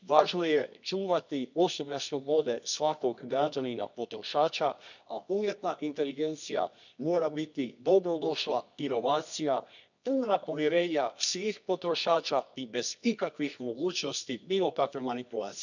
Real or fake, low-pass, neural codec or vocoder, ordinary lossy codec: fake; 7.2 kHz; codec, 24 kHz, 0.9 kbps, WavTokenizer, medium music audio release; none